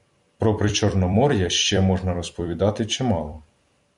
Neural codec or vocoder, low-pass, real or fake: vocoder, 44.1 kHz, 128 mel bands every 256 samples, BigVGAN v2; 10.8 kHz; fake